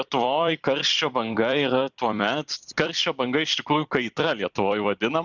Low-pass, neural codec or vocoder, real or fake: 7.2 kHz; vocoder, 44.1 kHz, 128 mel bands every 256 samples, BigVGAN v2; fake